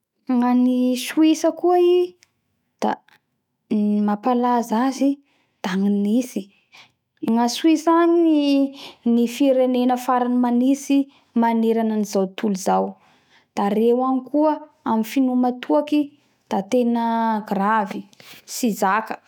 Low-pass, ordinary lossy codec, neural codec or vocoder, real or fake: 19.8 kHz; none; autoencoder, 48 kHz, 128 numbers a frame, DAC-VAE, trained on Japanese speech; fake